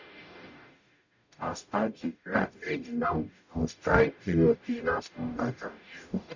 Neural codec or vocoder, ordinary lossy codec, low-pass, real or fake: codec, 44.1 kHz, 0.9 kbps, DAC; none; 7.2 kHz; fake